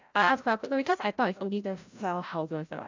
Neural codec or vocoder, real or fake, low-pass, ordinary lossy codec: codec, 16 kHz, 0.5 kbps, FreqCodec, larger model; fake; 7.2 kHz; MP3, 64 kbps